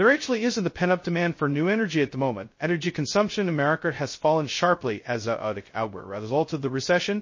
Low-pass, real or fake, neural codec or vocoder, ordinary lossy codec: 7.2 kHz; fake; codec, 16 kHz, 0.2 kbps, FocalCodec; MP3, 32 kbps